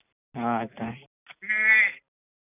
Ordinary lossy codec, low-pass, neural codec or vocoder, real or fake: none; 3.6 kHz; none; real